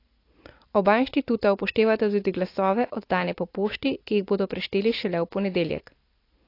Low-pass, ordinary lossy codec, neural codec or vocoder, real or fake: 5.4 kHz; AAC, 32 kbps; none; real